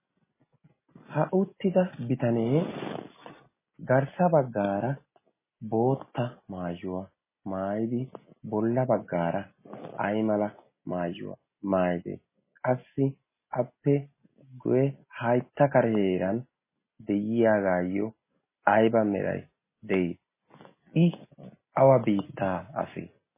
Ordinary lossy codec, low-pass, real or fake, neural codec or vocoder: MP3, 16 kbps; 3.6 kHz; real; none